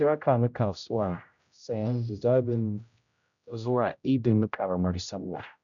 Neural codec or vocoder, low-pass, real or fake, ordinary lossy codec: codec, 16 kHz, 0.5 kbps, X-Codec, HuBERT features, trained on general audio; 7.2 kHz; fake; none